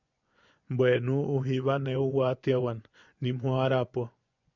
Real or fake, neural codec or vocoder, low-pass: fake; vocoder, 24 kHz, 100 mel bands, Vocos; 7.2 kHz